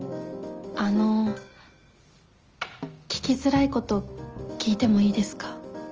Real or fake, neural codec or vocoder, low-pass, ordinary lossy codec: real; none; 7.2 kHz; Opus, 24 kbps